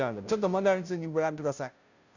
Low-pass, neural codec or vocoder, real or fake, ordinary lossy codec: 7.2 kHz; codec, 16 kHz, 0.5 kbps, FunCodec, trained on Chinese and English, 25 frames a second; fake; none